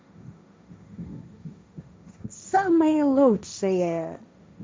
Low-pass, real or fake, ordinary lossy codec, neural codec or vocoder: none; fake; none; codec, 16 kHz, 1.1 kbps, Voila-Tokenizer